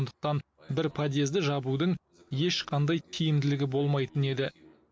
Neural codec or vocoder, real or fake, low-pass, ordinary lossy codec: none; real; none; none